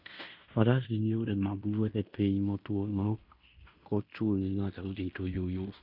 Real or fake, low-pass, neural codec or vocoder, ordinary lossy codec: fake; 5.4 kHz; codec, 16 kHz in and 24 kHz out, 0.9 kbps, LongCat-Audio-Codec, fine tuned four codebook decoder; none